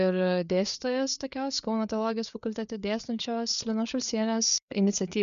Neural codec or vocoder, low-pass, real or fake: codec, 16 kHz, 4 kbps, FreqCodec, larger model; 7.2 kHz; fake